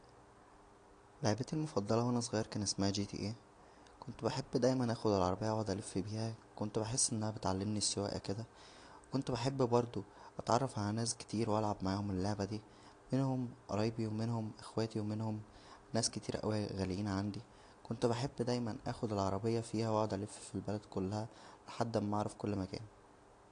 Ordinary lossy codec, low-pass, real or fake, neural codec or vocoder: AAC, 48 kbps; 9.9 kHz; real; none